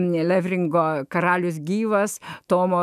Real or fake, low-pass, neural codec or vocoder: fake; 14.4 kHz; autoencoder, 48 kHz, 128 numbers a frame, DAC-VAE, trained on Japanese speech